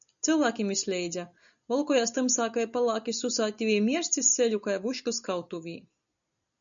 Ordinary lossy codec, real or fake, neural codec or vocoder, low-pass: MP3, 96 kbps; real; none; 7.2 kHz